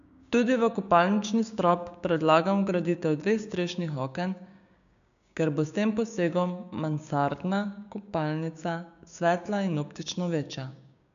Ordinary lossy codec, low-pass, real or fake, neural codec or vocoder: none; 7.2 kHz; fake; codec, 16 kHz, 6 kbps, DAC